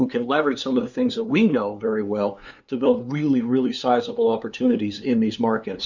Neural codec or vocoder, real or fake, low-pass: codec, 16 kHz, 2 kbps, FunCodec, trained on LibriTTS, 25 frames a second; fake; 7.2 kHz